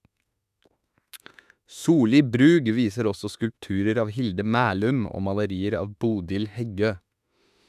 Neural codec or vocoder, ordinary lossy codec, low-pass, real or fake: autoencoder, 48 kHz, 32 numbers a frame, DAC-VAE, trained on Japanese speech; none; 14.4 kHz; fake